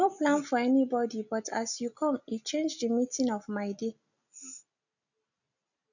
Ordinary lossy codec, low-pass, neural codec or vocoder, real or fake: none; 7.2 kHz; none; real